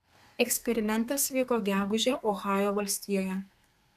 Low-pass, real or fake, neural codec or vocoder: 14.4 kHz; fake; codec, 32 kHz, 1.9 kbps, SNAC